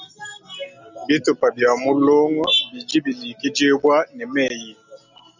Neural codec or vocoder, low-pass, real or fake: none; 7.2 kHz; real